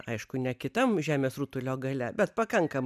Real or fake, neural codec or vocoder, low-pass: real; none; 14.4 kHz